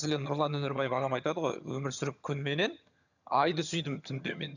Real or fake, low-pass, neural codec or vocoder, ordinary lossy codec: fake; 7.2 kHz; vocoder, 22.05 kHz, 80 mel bands, HiFi-GAN; none